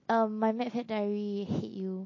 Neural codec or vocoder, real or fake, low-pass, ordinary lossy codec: none; real; 7.2 kHz; MP3, 32 kbps